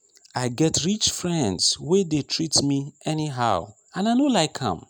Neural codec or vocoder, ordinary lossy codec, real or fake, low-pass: none; none; real; none